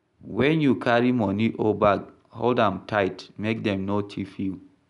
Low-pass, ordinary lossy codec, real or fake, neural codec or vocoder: 10.8 kHz; none; real; none